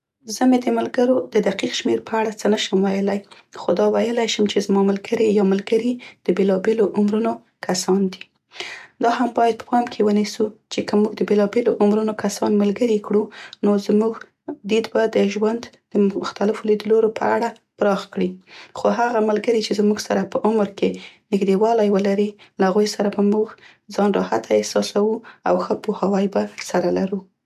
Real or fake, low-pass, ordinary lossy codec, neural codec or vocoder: real; 14.4 kHz; none; none